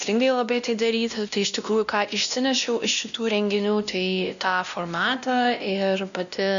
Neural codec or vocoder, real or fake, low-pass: codec, 16 kHz, 1 kbps, X-Codec, WavLM features, trained on Multilingual LibriSpeech; fake; 7.2 kHz